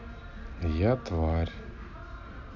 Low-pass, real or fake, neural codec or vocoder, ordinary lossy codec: 7.2 kHz; real; none; none